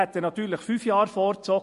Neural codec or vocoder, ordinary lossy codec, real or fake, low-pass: none; MP3, 48 kbps; real; 14.4 kHz